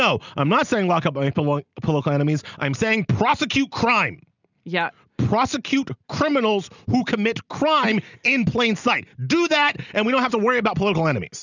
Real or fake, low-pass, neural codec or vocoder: real; 7.2 kHz; none